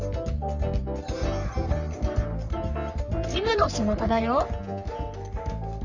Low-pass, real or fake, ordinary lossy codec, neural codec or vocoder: 7.2 kHz; fake; none; codec, 44.1 kHz, 3.4 kbps, Pupu-Codec